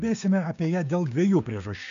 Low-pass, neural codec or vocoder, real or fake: 7.2 kHz; codec, 16 kHz, 6 kbps, DAC; fake